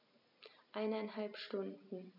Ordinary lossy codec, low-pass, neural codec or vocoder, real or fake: none; 5.4 kHz; none; real